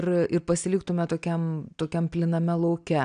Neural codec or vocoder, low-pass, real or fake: none; 9.9 kHz; real